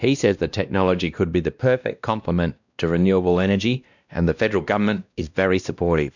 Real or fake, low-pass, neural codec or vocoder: fake; 7.2 kHz; codec, 16 kHz, 1 kbps, X-Codec, WavLM features, trained on Multilingual LibriSpeech